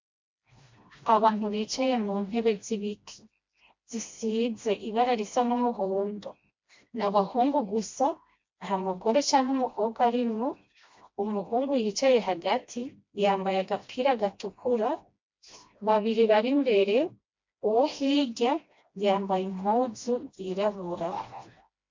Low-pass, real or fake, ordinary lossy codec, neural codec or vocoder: 7.2 kHz; fake; MP3, 48 kbps; codec, 16 kHz, 1 kbps, FreqCodec, smaller model